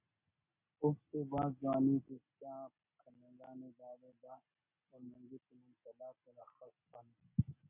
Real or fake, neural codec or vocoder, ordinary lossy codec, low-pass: real; none; MP3, 32 kbps; 3.6 kHz